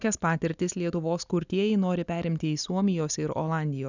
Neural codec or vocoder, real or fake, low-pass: none; real; 7.2 kHz